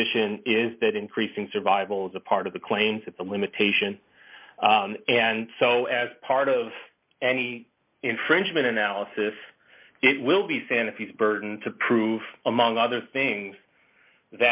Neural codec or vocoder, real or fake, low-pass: none; real; 3.6 kHz